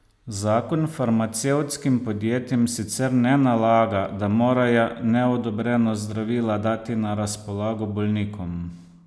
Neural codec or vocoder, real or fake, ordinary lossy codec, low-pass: none; real; none; none